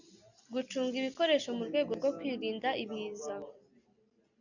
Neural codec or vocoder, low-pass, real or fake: none; 7.2 kHz; real